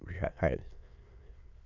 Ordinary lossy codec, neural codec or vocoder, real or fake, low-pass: none; autoencoder, 22.05 kHz, a latent of 192 numbers a frame, VITS, trained on many speakers; fake; 7.2 kHz